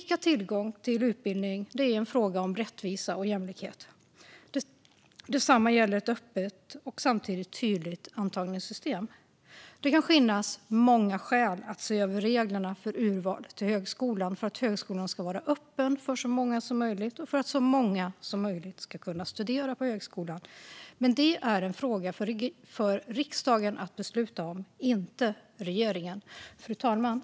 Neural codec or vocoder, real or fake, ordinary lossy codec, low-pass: none; real; none; none